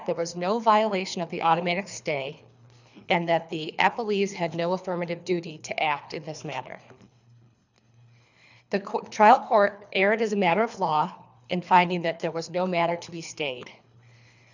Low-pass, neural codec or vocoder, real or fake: 7.2 kHz; codec, 24 kHz, 3 kbps, HILCodec; fake